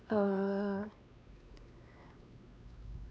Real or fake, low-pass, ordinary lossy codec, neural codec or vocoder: fake; none; none; codec, 16 kHz, 2 kbps, X-Codec, WavLM features, trained on Multilingual LibriSpeech